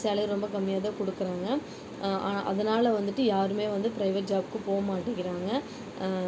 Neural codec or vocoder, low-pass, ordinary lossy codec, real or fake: none; none; none; real